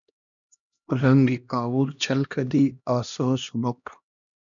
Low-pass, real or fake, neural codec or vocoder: 7.2 kHz; fake; codec, 16 kHz, 1 kbps, X-Codec, HuBERT features, trained on LibriSpeech